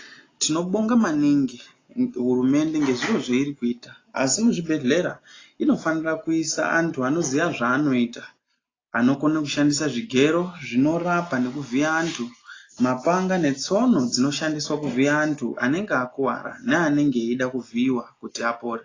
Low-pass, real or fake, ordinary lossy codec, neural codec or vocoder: 7.2 kHz; real; AAC, 32 kbps; none